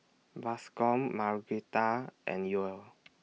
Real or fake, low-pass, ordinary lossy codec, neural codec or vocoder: real; none; none; none